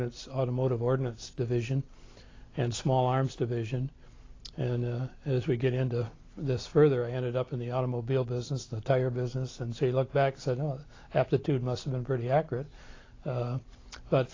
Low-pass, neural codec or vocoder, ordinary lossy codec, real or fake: 7.2 kHz; none; AAC, 32 kbps; real